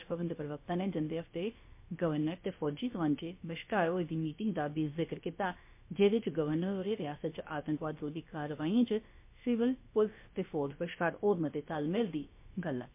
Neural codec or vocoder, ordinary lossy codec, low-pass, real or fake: codec, 16 kHz, about 1 kbps, DyCAST, with the encoder's durations; MP3, 24 kbps; 3.6 kHz; fake